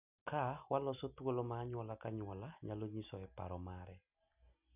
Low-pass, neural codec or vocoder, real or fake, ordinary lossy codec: 3.6 kHz; none; real; none